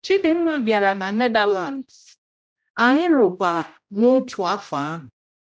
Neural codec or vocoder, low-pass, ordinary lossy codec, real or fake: codec, 16 kHz, 0.5 kbps, X-Codec, HuBERT features, trained on general audio; none; none; fake